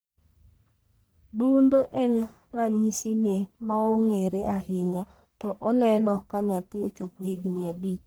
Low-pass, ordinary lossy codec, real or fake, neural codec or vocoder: none; none; fake; codec, 44.1 kHz, 1.7 kbps, Pupu-Codec